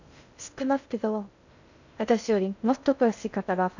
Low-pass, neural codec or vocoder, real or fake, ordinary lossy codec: 7.2 kHz; codec, 16 kHz in and 24 kHz out, 0.6 kbps, FocalCodec, streaming, 2048 codes; fake; none